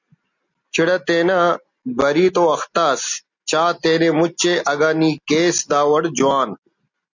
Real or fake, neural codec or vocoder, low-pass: real; none; 7.2 kHz